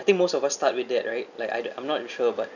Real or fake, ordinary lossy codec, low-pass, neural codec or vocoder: real; none; 7.2 kHz; none